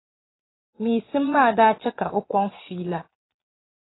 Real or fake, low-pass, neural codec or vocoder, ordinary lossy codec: fake; 7.2 kHz; vocoder, 22.05 kHz, 80 mel bands, Vocos; AAC, 16 kbps